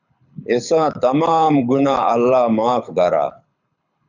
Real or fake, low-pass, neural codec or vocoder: fake; 7.2 kHz; codec, 24 kHz, 6 kbps, HILCodec